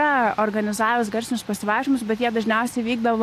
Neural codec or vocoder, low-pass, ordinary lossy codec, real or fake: none; 14.4 kHz; AAC, 64 kbps; real